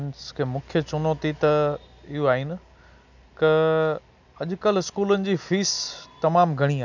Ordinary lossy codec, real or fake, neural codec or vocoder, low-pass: none; real; none; 7.2 kHz